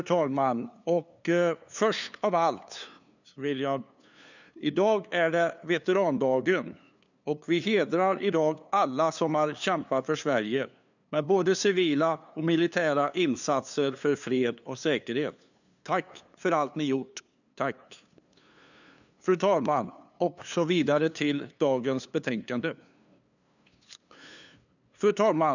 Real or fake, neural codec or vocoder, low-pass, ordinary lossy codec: fake; codec, 16 kHz, 2 kbps, FunCodec, trained on LibriTTS, 25 frames a second; 7.2 kHz; none